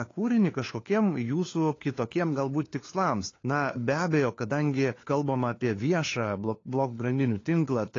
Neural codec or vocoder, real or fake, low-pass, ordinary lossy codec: codec, 16 kHz, 4 kbps, FunCodec, trained on LibriTTS, 50 frames a second; fake; 7.2 kHz; AAC, 32 kbps